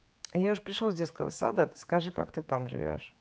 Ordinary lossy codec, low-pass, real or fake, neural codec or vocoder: none; none; fake; codec, 16 kHz, 4 kbps, X-Codec, HuBERT features, trained on general audio